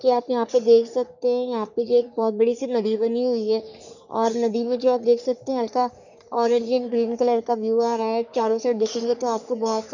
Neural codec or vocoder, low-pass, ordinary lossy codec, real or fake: codec, 44.1 kHz, 3.4 kbps, Pupu-Codec; 7.2 kHz; none; fake